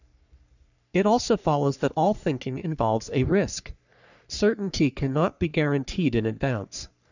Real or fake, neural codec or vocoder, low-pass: fake; codec, 44.1 kHz, 3.4 kbps, Pupu-Codec; 7.2 kHz